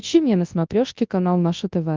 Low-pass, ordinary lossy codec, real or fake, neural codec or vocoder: 7.2 kHz; Opus, 24 kbps; fake; codec, 24 kHz, 0.9 kbps, WavTokenizer, large speech release